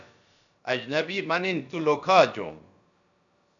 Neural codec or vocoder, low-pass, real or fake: codec, 16 kHz, about 1 kbps, DyCAST, with the encoder's durations; 7.2 kHz; fake